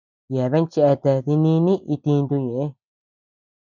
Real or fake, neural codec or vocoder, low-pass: real; none; 7.2 kHz